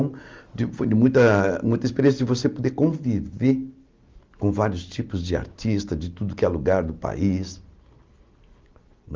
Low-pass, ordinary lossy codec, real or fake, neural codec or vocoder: 7.2 kHz; Opus, 32 kbps; real; none